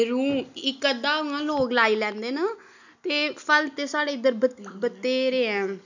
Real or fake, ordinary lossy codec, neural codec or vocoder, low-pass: real; none; none; 7.2 kHz